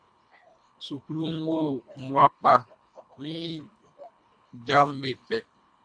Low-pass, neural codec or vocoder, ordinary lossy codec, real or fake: 9.9 kHz; codec, 24 kHz, 1.5 kbps, HILCodec; AAC, 64 kbps; fake